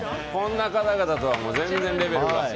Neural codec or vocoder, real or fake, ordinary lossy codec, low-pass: none; real; none; none